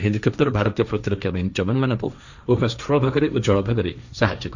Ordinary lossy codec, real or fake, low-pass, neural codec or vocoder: none; fake; 7.2 kHz; codec, 16 kHz, 1.1 kbps, Voila-Tokenizer